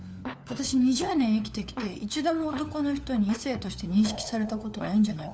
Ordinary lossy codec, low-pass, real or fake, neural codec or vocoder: none; none; fake; codec, 16 kHz, 4 kbps, FunCodec, trained on LibriTTS, 50 frames a second